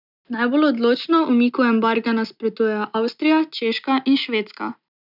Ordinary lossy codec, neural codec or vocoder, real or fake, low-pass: none; none; real; 5.4 kHz